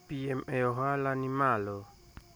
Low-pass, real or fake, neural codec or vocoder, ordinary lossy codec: none; real; none; none